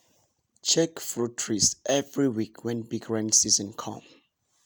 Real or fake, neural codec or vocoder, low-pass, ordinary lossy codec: real; none; none; none